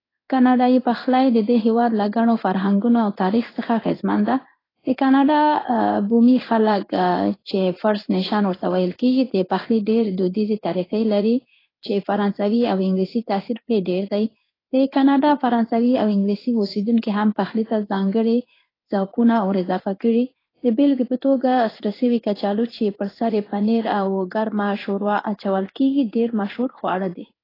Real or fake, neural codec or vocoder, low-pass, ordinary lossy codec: fake; codec, 16 kHz in and 24 kHz out, 1 kbps, XY-Tokenizer; 5.4 kHz; AAC, 24 kbps